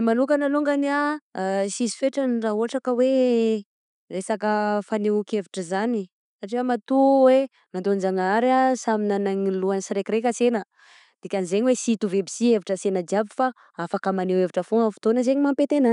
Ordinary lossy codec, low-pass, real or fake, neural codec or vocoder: none; 10.8 kHz; real; none